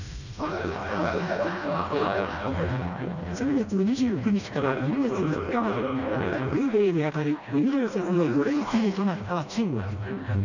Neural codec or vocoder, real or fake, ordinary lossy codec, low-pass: codec, 16 kHz, 1 kbps, FreqCodec, smaller model; fake; none; 7.2 kHz